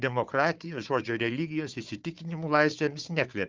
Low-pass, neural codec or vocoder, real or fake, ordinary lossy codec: 7.2 kHz; codec, 16 kHz, 4 kbps, FunCodec, trained on Chinese and English, 50 frames a second; fake; Opus, 32 kbps